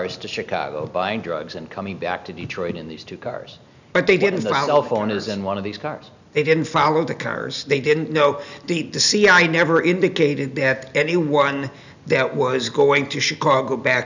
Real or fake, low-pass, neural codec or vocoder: real; 7.2 kHz; none